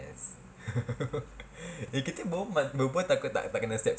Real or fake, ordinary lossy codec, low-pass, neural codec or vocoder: real; none; none; none